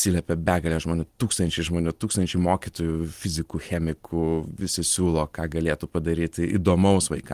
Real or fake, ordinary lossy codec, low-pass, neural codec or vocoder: real; Opus, 24 kbps; 14.4 kHz; none